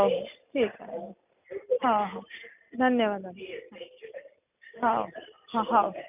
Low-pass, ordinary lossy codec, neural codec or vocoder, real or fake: 3.6 kHz; none; none; real